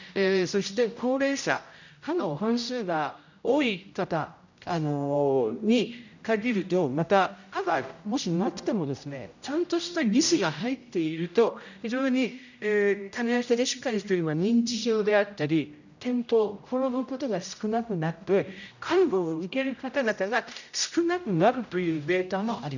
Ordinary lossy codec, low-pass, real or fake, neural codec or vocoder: none; 7.2 kHz; fake; codec, 16 kHz, 0.5 kbps, X-Codec, HuBERT features, trained on general audio